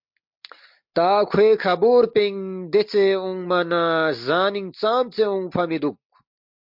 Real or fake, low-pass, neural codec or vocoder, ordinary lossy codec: real; 5.4 kHz; none; MP3, 48 kbps